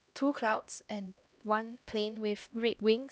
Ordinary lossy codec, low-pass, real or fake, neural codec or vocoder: none; none; fake; codec, 16 kHz, 1 kbps, X-Codec, HuBERT features, trained on LibriSpeech